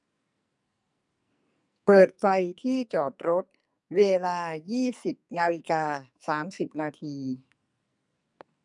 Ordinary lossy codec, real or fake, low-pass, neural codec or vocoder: MP3, 96 kbps; fake; 10.8 kHz; codec, 44.1 kHz, 2.6 kbps, SNAC